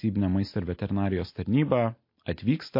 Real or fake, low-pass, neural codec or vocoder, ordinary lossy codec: real; 5.4 kHz; none; MP3, 32 kbps